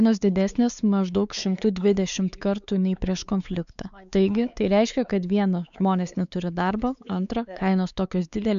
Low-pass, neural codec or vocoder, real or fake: 7.2 kHz; codec, 16 kHz, 4 kbps, X-Codec, WavLM features, trained on Multilingual LibriSpeech; fake